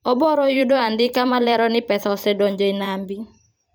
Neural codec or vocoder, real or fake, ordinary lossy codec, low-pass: vocoder, 44.1 kHz, 128 mel bands every 512 samples, BigVGAN v2; fake; none; none